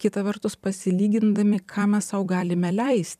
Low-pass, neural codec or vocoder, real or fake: 14.4 kHz; vocoder, 44.1 kHz, 128 mel bands every 256 samples, BigVGAN v2; fake